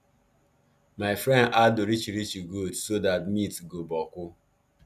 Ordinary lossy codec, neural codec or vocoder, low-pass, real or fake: none; none; 14.4 kHz; real